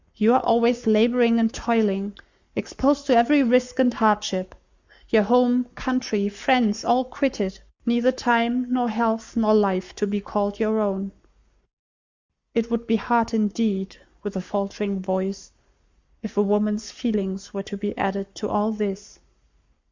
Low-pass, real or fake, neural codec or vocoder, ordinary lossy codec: 7.2 kHz; fake; codec, 44.1 kHz, 7.8 kbps, Pupu-Codec; Opus, 64 kbps